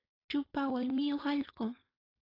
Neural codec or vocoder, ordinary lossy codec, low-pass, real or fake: codec, 16 kHz, 4.8 kbps, FACodec; AAC, 24 kbps; 5.4 kHz; fake